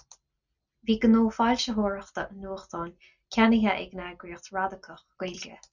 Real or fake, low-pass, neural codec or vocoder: real; 7.2 kHz; none